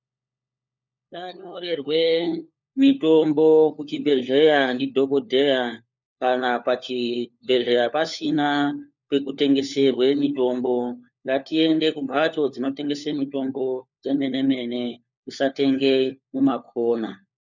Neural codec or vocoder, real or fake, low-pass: codec, 16 kHz, 4 kbps, FunCodec, trained on LibriTTS, 50 frames a second; fake; 7.2 kHz